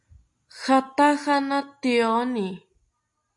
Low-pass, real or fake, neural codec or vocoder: 10.8 kHz; real; none